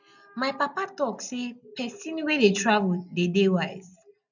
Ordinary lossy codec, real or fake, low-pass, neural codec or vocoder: none; real; 7.2 kHz; none